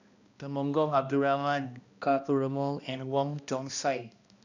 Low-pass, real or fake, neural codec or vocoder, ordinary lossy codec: 7.2 kHz; fake; codec, 16 kHz, 1 kbps, X-Codec, HuBERT features, trained on balanced general audio; none